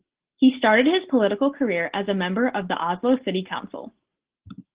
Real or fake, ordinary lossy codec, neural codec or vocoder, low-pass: real; Opus, 16 kbps; none; 3.6 kHz